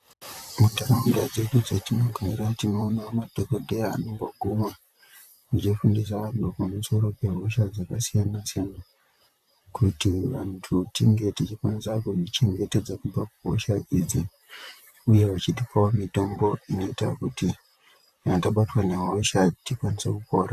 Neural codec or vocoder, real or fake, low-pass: vocoder, 44.1 kHz, 128 mel bands, Pupu-Vocoder; fake; 14.4 kHz